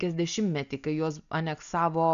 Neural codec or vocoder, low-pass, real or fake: none; 7.2 kHz; real